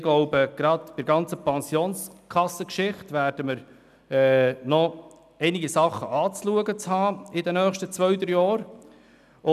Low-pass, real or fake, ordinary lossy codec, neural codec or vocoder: 14.4 kHz; real; none; none